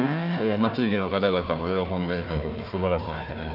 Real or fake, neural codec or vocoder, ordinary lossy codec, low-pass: fake; codec, 16 kHz, 1 kbps, FunCodec, trained on Chinese and English, 50 frames a second; none; 5.4 kHz